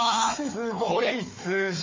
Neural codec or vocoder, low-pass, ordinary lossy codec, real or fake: codec, 16 kHz, 4 kbps, FunCodec, trained on Chinese and English, 50 frames a second; 7.2 kHz; MP3, 32 kbps; fake